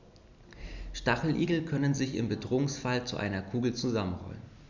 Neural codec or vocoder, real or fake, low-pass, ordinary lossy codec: none; real; 7.2 kHz; none